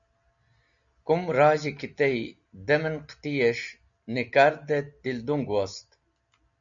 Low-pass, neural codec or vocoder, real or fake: 7.2 kHz; none; real